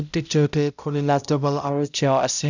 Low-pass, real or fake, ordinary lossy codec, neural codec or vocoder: 7.2 kHz; fake; none; codec, 16 kHz, 0.5 kbps, X-Codec, HuBERT features, trained on balanced general audio